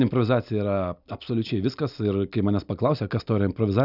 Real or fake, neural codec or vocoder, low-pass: real; none; 5.4 kHz